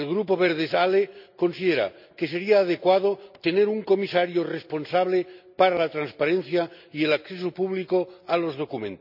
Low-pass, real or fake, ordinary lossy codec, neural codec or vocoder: 5.4 kHz; real; none; none